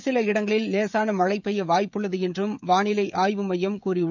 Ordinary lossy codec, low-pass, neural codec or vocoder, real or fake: none; 7.2 kHz; codec, 16 kHz, 16 kbps, FreqCodec, smaller model; fake